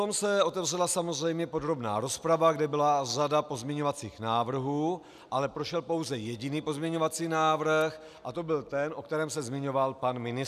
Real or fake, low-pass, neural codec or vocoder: real; 14.4 kHz; none